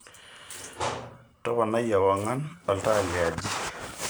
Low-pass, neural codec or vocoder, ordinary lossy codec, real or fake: none; vocoder, 44.1 kHz, 128 mel bands every 512 samples, BigVGAN v2; none; fake